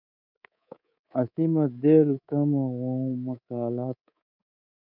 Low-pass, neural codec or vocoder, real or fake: 5.4 kHz; codec, 24 kHz, 3.1 kbps, DualCodec; fake